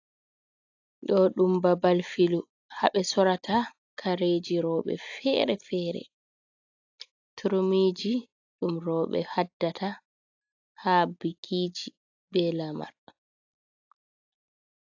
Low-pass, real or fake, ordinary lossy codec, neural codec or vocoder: 7.2 kHz; real; AAC, 48 kbps; none